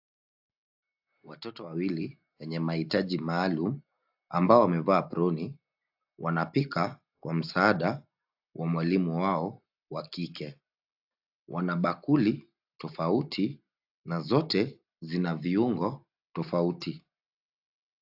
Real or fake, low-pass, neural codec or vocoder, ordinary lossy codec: real; 5.4 kHz; none; AAC, 48 kbps